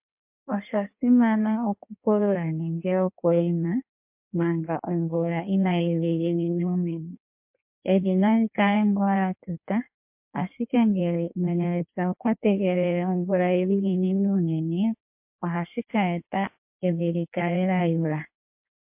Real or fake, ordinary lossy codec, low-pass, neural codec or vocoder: fake; MP3, 32 kbps; 3.6 kHz; codec, 16 kHz in and 24 kHz out, 1.1 kbps, FireRedTTS-2 codec